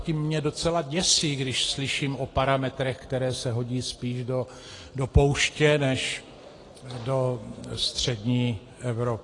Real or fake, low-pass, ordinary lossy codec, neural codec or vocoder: real; 10.8 kHz; AAC, 32 kbps; none